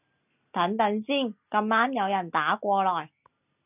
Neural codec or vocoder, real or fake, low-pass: none; real; 3.6 kHz